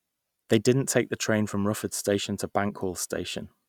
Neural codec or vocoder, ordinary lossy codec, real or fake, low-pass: none; none; real; 19.8 kHz